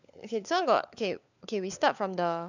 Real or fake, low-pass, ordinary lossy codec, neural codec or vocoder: fake; 7.2 kHz; none; codec, 16 kHz, 4 kbps, FunCodec, trained on LibriTTS, 50 frames a second